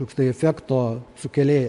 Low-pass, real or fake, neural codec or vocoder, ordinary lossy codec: 10.8 kHz; fake; vocoder, 24 kHz, 100 mel bands, Vocos; MP3, 64 kbps